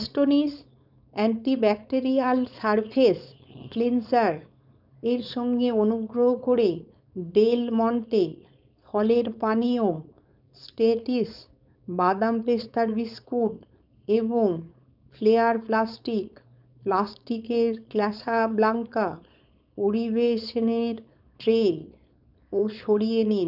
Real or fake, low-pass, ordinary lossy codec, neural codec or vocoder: fake; 5.4 kHz; none; codec, 16 kHz, 4.8 kbps, FACodec